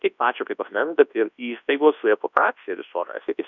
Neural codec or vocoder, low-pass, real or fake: codec, 24 kHz, 0.9 kbps, WavTokenizer, large speech release; 7.2 kHz; fake